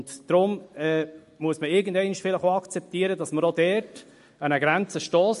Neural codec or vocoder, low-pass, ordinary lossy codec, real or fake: none; 14.4 kHz; MP3, 48 kbps; real